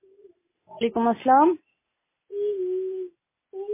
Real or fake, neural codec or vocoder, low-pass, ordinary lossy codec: real; none; 3.6 kHz; MP3, 16 kbps